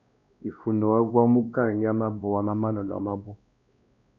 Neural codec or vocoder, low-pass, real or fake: codec, 16 kHz, 1 kbps, X-Codec, WavLM features, trained on Multilingual LibriSpeech; 7.2 kHz; fake